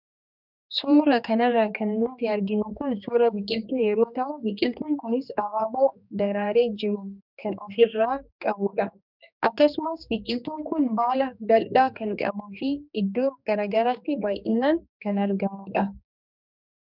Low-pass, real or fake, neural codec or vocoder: 5.4 kHz; fake; codec, 16 kHz, 2 kbps, X-Codec, HuBERT features, trained on general audio